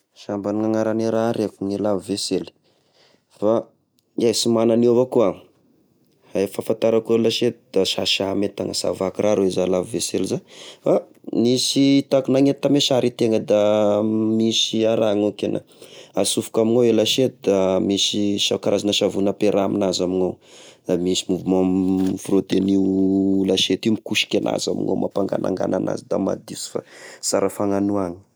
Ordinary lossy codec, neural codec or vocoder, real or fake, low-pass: none; none; real; none